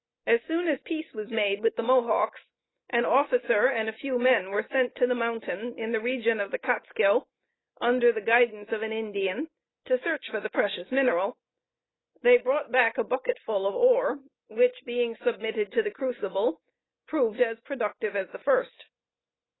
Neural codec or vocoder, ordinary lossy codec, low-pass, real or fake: none; AAC, 16 kbps; 7.2 kHz; real